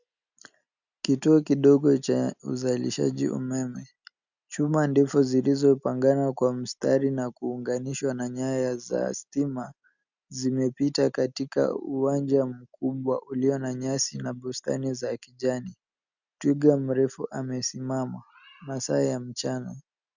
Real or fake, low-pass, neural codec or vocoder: real; 7.2 kHz; none